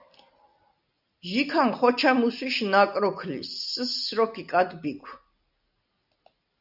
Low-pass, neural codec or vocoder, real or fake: 5.4 kHz; none; real